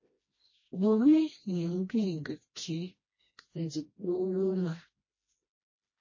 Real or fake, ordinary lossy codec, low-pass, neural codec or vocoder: fake; MP3, 32 kbps; 7.2 kHz; codec, 16 kHz, 1 kbps, FreqCodec, smaller model